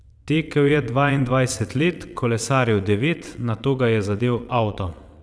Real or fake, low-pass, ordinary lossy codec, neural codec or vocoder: fake; none; none; vocoder, 22.05 kHz, 80 mel bands, WaveNeXt